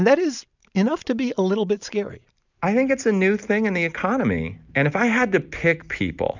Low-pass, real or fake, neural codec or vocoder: 7.2 kHz; real; none